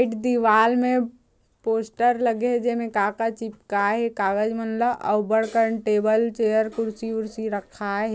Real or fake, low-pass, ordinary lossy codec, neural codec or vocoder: real; none; none; none